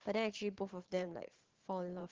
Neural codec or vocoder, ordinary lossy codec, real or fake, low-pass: vocoder, 44.1 kHz, 128 mel bands every 512 samples, BigVGAN v2; Opus, 16 kbps; fake; 7.2 kHz